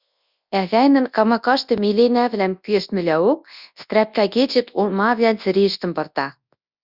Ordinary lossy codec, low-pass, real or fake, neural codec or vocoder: Opus, 64 kbps; 5.4 kHz; fake; codec, 24 kHz, 0.9 kbps, WavTokenizer, large speech release